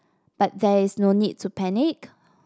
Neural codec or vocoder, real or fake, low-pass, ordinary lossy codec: none; real; none; none